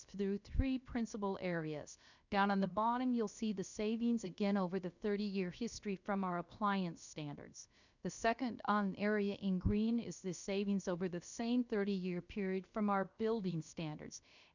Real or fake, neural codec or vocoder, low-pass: fake; codec, 16 kHz, about 1 kbps, DyCAST, with the encoder's durations; 7.2 kHz